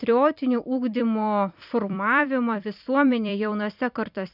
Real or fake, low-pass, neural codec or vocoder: fake; 5.4 kHz; vocoder, 44.1 kHz, 128 mel bands every 256 samples, BigVGAN v2